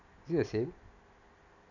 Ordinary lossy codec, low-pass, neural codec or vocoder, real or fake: none; 7.2 kHz; none; real